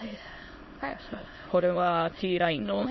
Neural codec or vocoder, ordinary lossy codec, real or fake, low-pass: autoencoder, 22.05 kHz, a latent of 192 numbers a frame, VITS, trained on many speakers; MP3, 24 kbps; fake; 7.2 kHz